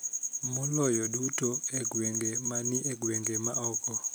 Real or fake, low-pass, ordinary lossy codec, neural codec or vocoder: real; none; none; none